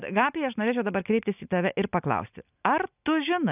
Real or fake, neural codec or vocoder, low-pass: real; none; 3.6 kHz